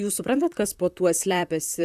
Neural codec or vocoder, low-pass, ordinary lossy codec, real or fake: vocoder, 44.1 kHz, 128 mel bands, Pupu-Vocoder; 14.4 kHz; AAC, 96 kbps; fake